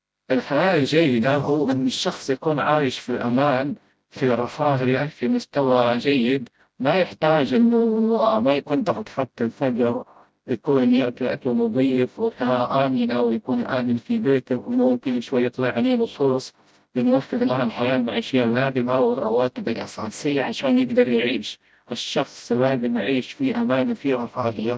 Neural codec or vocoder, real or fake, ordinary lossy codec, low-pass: codec, 16 kHz, 0.5 kbps, FreqCodec, smaller model; fake; none; none